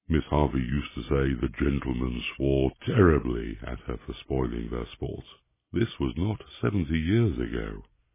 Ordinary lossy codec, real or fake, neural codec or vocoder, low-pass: MP3, 16 kbps; real; none; 3.6 kHz